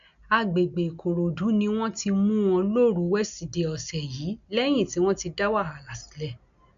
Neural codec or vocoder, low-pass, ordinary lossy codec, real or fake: none; 7.2 kHz; none; real